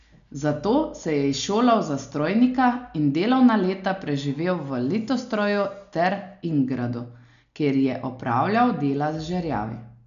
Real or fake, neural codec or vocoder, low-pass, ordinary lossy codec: real; none; 7.2 kHz; none